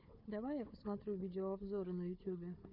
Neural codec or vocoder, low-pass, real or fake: codec, 16 kHz, 4 kbps, FunCodec, trained on Chinese and English, 50 frames a second; 5.4 kHz; fake